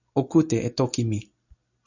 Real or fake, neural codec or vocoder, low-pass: real; none; 7.2 kHz